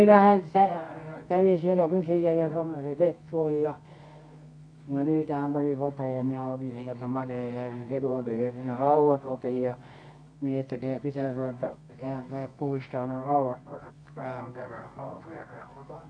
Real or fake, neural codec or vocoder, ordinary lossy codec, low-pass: fake; codec, 24 kHz, 0.9 kbps, WavTokenizer, medium music audio release; AAC, 64 kbps; 9.9 kHz